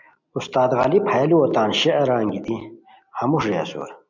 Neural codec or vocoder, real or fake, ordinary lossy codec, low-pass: none; real; MP3, 64 kbps; 7.2 kHz